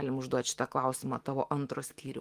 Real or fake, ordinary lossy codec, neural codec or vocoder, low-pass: fake; Opus, 24 kbps; autoencoder, 48 kHz, 128 numbers a frame, DAC-VAE, trained on Japanese speech; 14.4 kHz